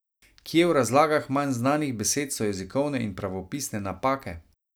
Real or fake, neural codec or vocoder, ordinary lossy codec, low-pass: real; none; none; none